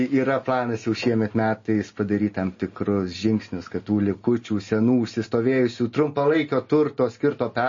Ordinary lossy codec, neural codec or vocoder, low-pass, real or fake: MP3, 32 kbps; none; 7.2 kHz; real